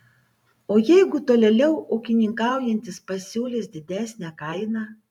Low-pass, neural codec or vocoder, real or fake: 19.8 kHz; vocoder, 44.1 kHz, 128 mel bands every 512 samples, BigVGAN v2; fake